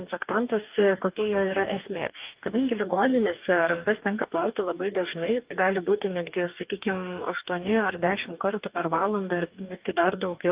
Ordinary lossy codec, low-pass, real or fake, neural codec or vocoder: Opus, 24 kbps; 3.6 kHz; fake; codec, 44.1 kHz, 2.6 kbps, DAC